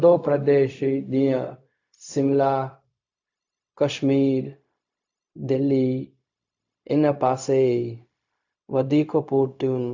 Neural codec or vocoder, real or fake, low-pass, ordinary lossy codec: codec, 16 kHz, 0.4 kbps, LongCat-Audio-Codec; fake; 7.2 kHz; AAC, 48 kbps